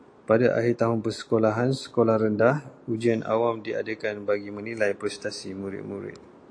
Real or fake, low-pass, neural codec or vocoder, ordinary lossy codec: real; 9.9 kHz; none; AAC, 48 kbps